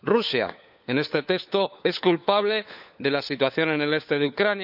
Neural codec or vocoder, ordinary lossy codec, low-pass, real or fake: codec, 16 kHz, 4 kbps, FunCodec, trained on LibriTTS, 50 frames a second; none; 5.4 kHz; fake